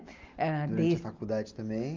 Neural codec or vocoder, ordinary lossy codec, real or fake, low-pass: none; Opus, 24 kbps; real; 7.2 kHz